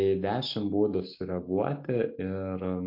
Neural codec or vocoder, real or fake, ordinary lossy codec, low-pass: none; real; MP3, 32 kbps; 5.4 kHz